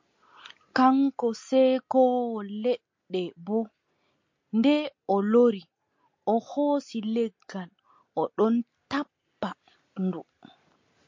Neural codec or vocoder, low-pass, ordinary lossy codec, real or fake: none; 7.2 kHz; MP3, 48 kbps; real